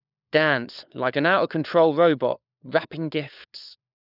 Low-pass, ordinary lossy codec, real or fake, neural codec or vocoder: 5.4 kHz; none; fake; codec, 16 kHz, 4 kbps, FunCodec, trained on LibriTTS, 50 frames a second